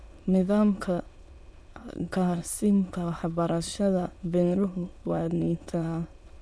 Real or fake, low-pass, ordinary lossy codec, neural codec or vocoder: fake; none; none; autoencoder, 22.05 kHz, a latent of 192 numbers a frame, VITS, trained on many speakers